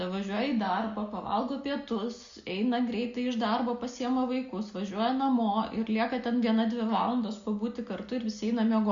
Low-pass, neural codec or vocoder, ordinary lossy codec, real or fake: 7.2 kHz; none; Opus, 64 kbps; real